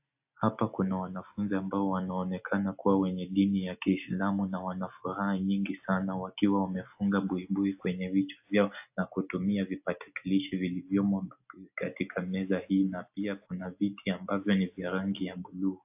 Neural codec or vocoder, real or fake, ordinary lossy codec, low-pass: none; real; AAC, 32 kbps; 3.6 kHz